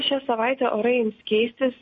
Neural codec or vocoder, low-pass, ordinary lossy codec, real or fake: none; 10.8 kHz; MP3, 32 kbps; real